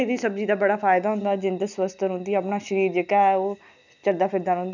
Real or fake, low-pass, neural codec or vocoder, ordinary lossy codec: real; 7.2 kHz; none; none